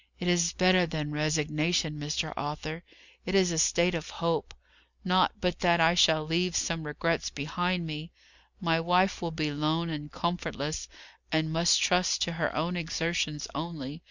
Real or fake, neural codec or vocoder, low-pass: real; none; 7.2 kHz